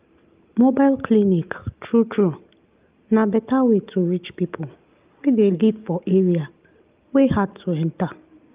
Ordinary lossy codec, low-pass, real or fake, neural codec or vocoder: Opus, 24 kbps; 3.6 kHz; real; none